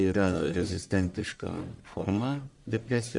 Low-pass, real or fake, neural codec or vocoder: 10.8 kHz; fake; codec, 44.1 kHz, 1.7 kbps, Pupu-Codec